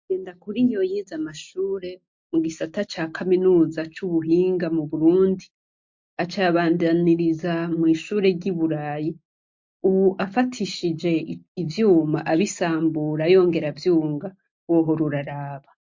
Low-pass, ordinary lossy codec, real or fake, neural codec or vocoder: 7.2 kHz; MP3, 48 kbps; real; none